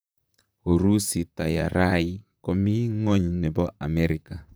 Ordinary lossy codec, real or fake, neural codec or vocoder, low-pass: none; fake; vocoder, 44.1 kHz, 128 mel bands, Pupu-Vocoder; none